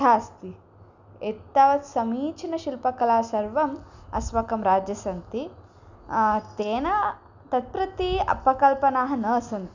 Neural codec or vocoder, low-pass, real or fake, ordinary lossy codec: none; 7.2 kHz; real; none